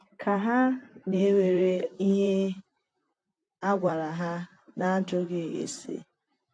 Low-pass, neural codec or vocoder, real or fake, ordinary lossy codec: 9.9 kHz; vocoder, 44.1 kHz, 128 mel bands, Pupu-Vocoder; fake; AAC, 48 kbps